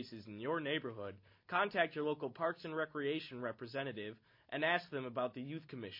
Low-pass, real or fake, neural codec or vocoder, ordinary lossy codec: 5.4 kHz; real; none; MP3, 32 kbps